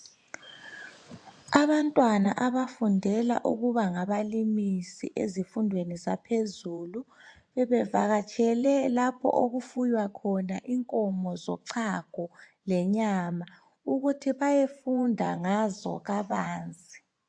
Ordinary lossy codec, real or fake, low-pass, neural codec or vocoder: AAC, 64 kbps; fake; 9.9 kHz; vocoder, 24 kHz, 100 mel bands, Vocos